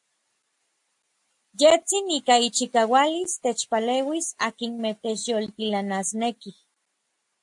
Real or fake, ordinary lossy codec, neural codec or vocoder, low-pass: real; AAC, 64 kbps; none; 10.8 kHz